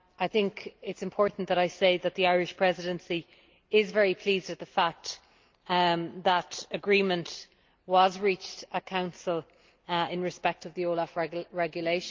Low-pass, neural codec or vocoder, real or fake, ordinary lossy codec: 7.2 kHz; none; real; Opus, 32 kbps